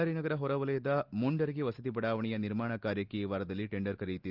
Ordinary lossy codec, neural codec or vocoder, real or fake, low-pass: Opus, 24 kbps; none; real; 5.4 kHz